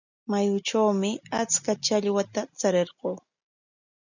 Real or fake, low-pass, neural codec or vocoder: real; 7.2 kHz; none